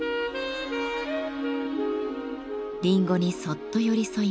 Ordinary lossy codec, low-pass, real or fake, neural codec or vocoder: none; none; real; none